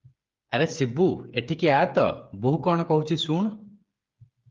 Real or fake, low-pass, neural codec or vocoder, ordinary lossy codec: fake; 7.2 kHz; codec, 16 kHz, 8 kbps, FreqCodec, smaller model; Opus, 32 kbps